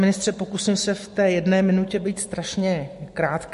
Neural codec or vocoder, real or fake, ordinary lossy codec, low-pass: none; real; MP3, 48 kbps; 14.4 kHz